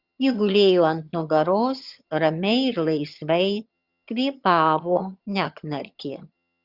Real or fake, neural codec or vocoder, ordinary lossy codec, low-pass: fake; vocoder, 22.05 kHz, 80 mel bands, HiFi-GAN; Opus, 64 kbps; 5.4 kHz